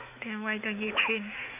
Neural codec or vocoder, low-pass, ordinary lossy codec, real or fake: autoencoder, 48 kHz, 128 numbers a frame, DAC-VAE, trained on Japanese speech; 3.6 kHz; none; fake